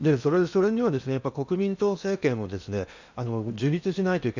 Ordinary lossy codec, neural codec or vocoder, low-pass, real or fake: none; codec, 16 kHz in and 24 kHz out, 0.8 kbps, FocalCodec, streaming, 65536 codes; 7.2 kHz; fake